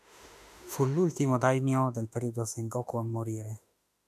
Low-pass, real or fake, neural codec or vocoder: 14.4 kHz; fake; autoencoder, 48 kHz, 32 numbers a frame, DAC-VAE, trained on Japanese speech